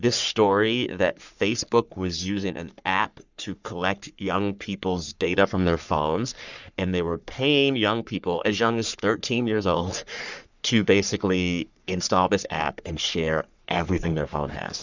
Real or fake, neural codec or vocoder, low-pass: fake; codec, 44.1 kHz, 3.4 kbps, Pupu-Codec; 7.2 kHz